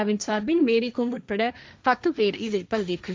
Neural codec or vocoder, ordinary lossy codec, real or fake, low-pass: codec, 16 kHz, 1.1 kbps, Voila-Tokenizer; none; fake; none